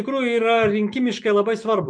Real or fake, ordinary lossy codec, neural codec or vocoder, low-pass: real; MP3, 64 kbps; none; 9.9 kHz